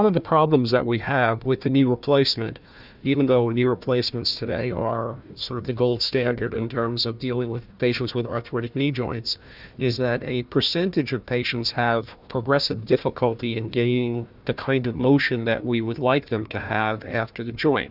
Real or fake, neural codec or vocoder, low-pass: fake; codec, 16 kHz, 1 kbps, FunCodec, trained on Chinese and English, 50 frames a second; 5.4 kHz